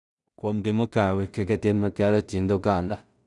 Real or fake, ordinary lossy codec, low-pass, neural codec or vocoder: fake; none; 10.8 kHz; codec, 16 kHz in and 24 kHz out, 0.4 kbps, LongCat-Audio-Codec, two codebook decoder